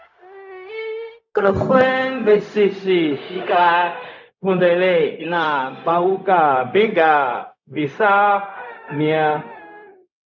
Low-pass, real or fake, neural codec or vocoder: 7.2 kHz; fake; codec, 16 kHz, 0.4 kbps, LongCat-Audio-Codec